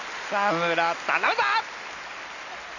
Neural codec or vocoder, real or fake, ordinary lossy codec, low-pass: none; real; none; 7.2 kHz